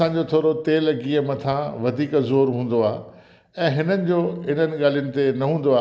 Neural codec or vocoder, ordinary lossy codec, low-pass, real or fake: none; none; none; real